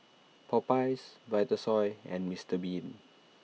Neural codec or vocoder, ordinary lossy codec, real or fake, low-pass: none; none; real; none